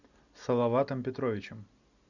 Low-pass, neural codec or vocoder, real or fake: 7.2 kHz; none; real